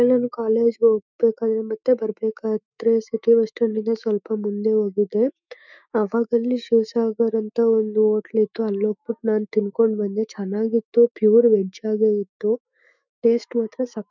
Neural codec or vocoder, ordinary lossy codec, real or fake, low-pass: autoencoder, 48 kHz, 128 numbers a frame, DAC-VAE, trained on Japanese speech; none; fake; 7.2 kHz